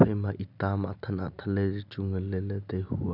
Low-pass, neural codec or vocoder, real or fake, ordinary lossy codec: 5.4 kHz; none; real; none